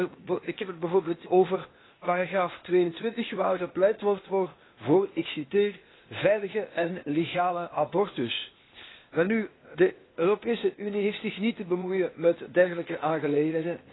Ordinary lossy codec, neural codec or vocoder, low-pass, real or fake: AAC, 16 kbps; codec, 16 kHz, 0.8 kbps, ZipCodec; 7.2 kHz; fake